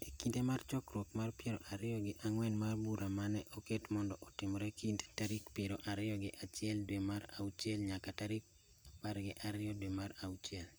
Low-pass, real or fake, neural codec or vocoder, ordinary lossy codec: none; real; none; none